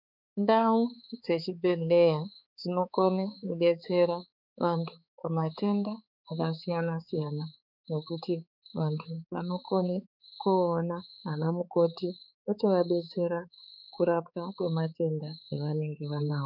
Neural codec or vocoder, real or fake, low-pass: codec, 16 kHz, 4 kbps, X-Codec, HuBERT features, trained on balanced general audio; fake; 5.4 kHz